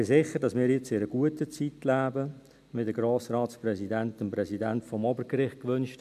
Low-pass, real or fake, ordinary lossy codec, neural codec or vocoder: 14.4 kHz; real; MP3, 96 kbps; none